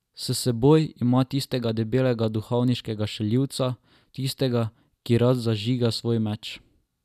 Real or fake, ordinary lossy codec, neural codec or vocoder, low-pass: real; none; none; 14.4 kHz